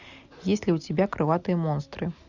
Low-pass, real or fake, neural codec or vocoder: 7.2 kHz; real; none